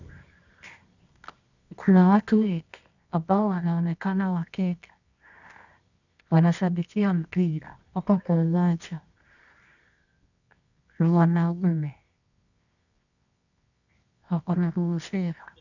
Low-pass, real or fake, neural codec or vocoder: 7.2 kHz; fake; codec, 24 kHz, 0.9 kbps, WavTokenizer, medium music audio release